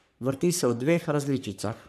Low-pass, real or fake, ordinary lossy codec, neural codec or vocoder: 14.4 kHz; fake; none; codec, 44.1 kHz, 3.4 kbps, Pupu-Codec